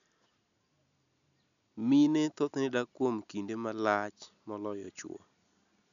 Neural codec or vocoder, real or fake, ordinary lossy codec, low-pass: none; real; none; 7.2 kHz